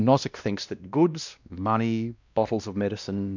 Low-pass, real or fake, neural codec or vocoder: 7.2 kHz; fake; codec, 16 kHz, 1 kbps, X-Codec, WavLM features, trained on Multilingual LibriSpeech